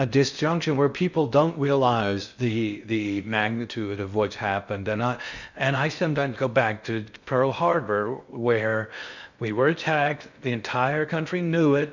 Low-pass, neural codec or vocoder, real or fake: 7.2 kHz; codec, 16 kHz in and 24 kHz out, 0.6 kbps, FocalCodec, streaming, 2048 codes; fake